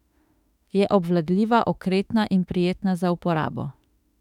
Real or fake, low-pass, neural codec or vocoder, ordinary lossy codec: fake; 19.8 kHz; autoencoder, 48 kHz, 32 numbers a frame, DAC-VAE, trained on Japanese speech; none